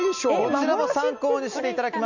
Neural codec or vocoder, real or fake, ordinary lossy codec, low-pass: none; real; none; 7.2 kHz